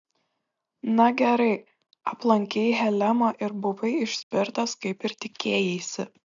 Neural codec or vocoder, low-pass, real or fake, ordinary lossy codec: none; 7.2 kHz; real; AAC, 64 kbps